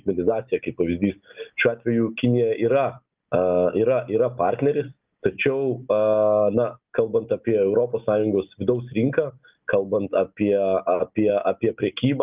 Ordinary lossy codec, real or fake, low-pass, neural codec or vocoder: Opus, 24 kbps; real; 3.6 kHz; none